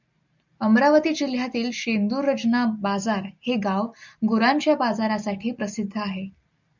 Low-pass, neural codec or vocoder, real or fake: 7.2 kHz; none; real